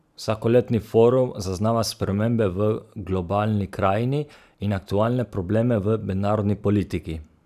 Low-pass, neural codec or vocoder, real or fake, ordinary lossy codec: 14.4 kHz; vocoder, 44.1 kHz, 128 mel bands every 512 samples, BigVGAN v2; fake; none